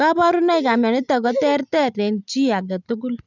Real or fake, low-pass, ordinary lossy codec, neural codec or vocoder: real; 7.2 kHz; none; none